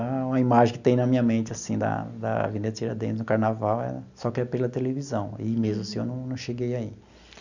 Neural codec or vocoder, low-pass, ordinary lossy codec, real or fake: none; 7.2 kHz; none; real